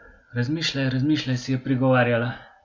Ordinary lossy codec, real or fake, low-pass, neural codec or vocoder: none; real; none; none